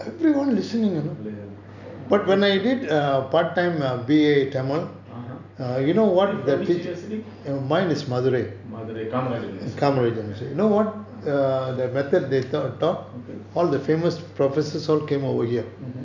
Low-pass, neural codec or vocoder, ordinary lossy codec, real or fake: 7.2 kHz; none; none; real